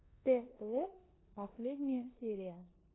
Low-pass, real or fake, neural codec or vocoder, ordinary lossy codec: 7.2 kHz; fake; codec, 16 kHz in and 24 kHz out, 0.9 kbps, LongCat-Audio-Codec, four codebook decoder; AAC, 16 kbps